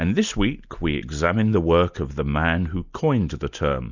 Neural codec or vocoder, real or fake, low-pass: none; real; 7.2 kHz